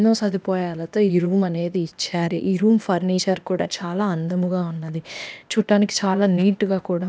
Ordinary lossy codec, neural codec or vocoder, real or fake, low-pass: none; codec, 16 kHz, 0.8 kbps, ZipCodec; fake; none